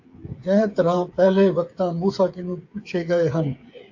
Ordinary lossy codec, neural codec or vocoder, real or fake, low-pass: AAC, 48 kbps; codec, 16 kHz, 8 kbps, FreqCodec, smaller model; fake; 7.2 kHz